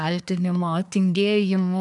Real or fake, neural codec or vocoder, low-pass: fake; codec, 24 kHz, 1 kbps, SNAC; 10.8 kHz